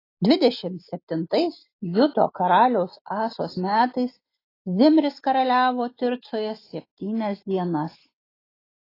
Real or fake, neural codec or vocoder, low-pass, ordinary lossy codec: real; none; 5.4 kHz; AAC, 24 kbps